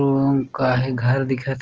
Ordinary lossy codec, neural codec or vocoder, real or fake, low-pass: Opus, 16 kbps; none; real; 7.2 kHz